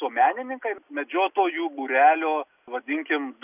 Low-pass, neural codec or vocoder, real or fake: 3.6 kHz; none; real